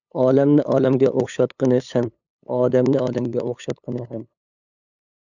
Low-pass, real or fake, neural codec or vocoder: 7.2 kHz; fake; codec, 16 kHz, 8 kbps, FunCodec, trained on LibriTTS, 25 frames a second